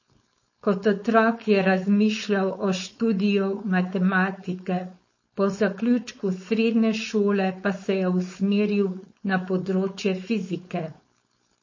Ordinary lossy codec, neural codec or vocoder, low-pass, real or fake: MP3, 32 kbps; codec, 16 kHz, 4.8 kbps, FACodec; 7.2 kHz; fake